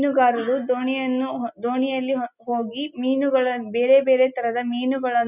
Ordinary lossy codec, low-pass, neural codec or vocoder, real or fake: none; 3.6 kHz; none; real